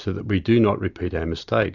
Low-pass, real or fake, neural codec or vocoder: 7.2 kHz; real; none